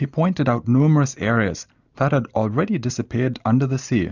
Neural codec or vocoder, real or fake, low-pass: none; real; 7.2 kHz